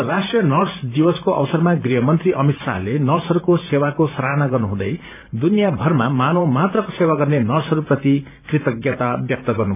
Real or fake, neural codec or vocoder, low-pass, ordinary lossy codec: fake; vocoder, 44.1 kHz, 128 mel bands every 512 samples, BigVGAN v2; 3.6 kHz; none